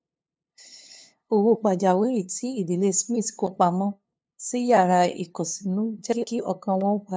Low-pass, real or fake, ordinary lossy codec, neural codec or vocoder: none; fake; none; codec, 16 kHz, 2 kbps, FunCodec, trained on LibriTTS, 25 frames a second